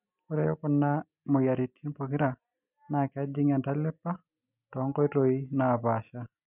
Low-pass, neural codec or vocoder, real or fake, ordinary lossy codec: 3.6 kHz; none; real; none